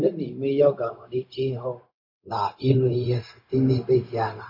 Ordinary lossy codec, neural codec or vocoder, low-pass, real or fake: MP3, 32 kbps; codec, 16 kHz, 0.4 kbps, LongCat-Audio-Codec; 5.4 kHz; fake